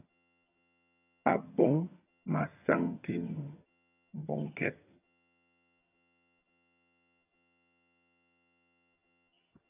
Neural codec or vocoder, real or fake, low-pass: vocoder, 22.05 kHz, 80 mel bands, HiFi-GAN; fake; 3.6 kHz